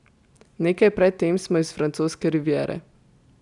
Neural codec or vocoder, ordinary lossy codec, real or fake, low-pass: none; none; real; 10.8 kHz